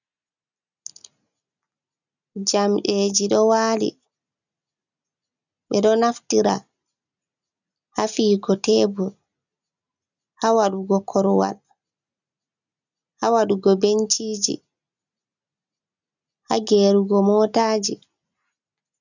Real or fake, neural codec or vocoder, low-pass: real; none; 7.2 kHz